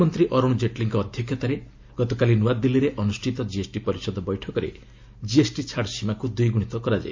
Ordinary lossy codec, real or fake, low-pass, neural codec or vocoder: MP3, 48 kbps; real; 7.2 kHz; none